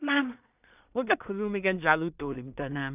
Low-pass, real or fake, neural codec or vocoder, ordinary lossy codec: 3.6 kHz; fake; codec, 16 kHz in and 24 kHz out, 0.4 kbps, LongCat-Audio-Codec, two codebook decoder; none